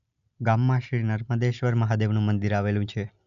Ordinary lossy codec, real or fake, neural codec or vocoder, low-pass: none; real; none; 7.2 kHz